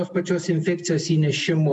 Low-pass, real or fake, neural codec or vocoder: 10.8 kHz; real; none